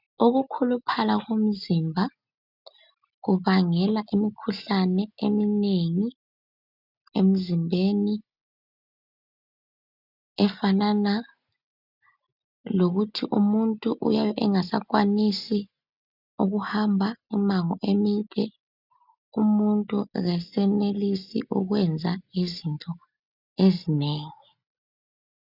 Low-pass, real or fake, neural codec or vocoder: 5.4 kHz; real; none